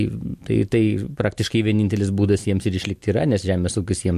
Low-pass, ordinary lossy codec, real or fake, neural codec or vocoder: 14.4 kHz; MP3, 64 kbps; real; none